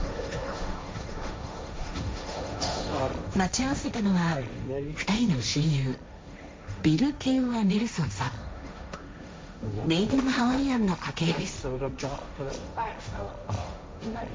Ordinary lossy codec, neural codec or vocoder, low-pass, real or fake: none; codec, 16 kHz, 1.1 kbps, Voila-Tokenizer; none; fake